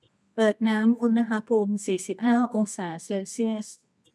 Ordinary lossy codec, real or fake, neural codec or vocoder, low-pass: none; fake; codec, 24 kHz, 0.9 kbps, WavTokenizer, medium music audio release; none